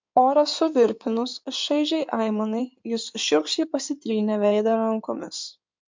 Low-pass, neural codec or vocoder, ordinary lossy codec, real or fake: 7.2 kHz; codec, 16 kHz in and 24 kHz out, 2.2 kbps, FireRedTTS-2 codec; MP3, 64 kbps; fake